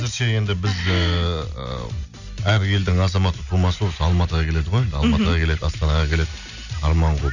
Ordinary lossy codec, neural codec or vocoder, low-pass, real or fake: none; none; 7.2 kHz; real